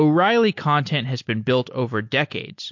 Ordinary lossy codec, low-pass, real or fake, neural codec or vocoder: MP3, 48 kbps; 7.2 kHz; real; none